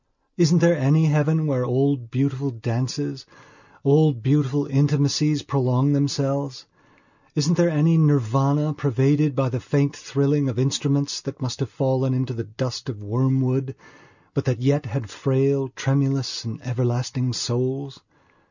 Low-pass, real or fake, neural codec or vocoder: 7.2 kHz; real; none